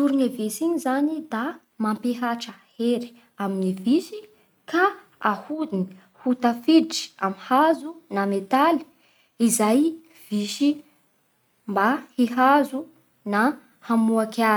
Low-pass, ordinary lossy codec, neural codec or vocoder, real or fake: none; none; none; real